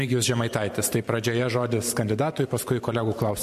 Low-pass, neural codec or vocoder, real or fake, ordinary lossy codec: 14.4 kHz; vocoder, 44.1 kHz, 128 mel bands every 512 samples, BigVGAN v2; fake; MP3, 64 kbps